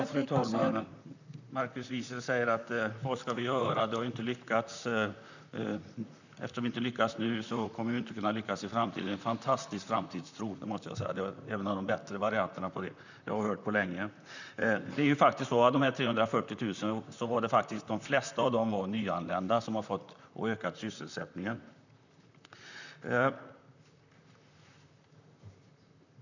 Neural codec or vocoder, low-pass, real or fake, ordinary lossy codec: vocoder, 44.1 kHz, 128 mel bands, Pupu-Vocoder; 7.2 kHz; fake; none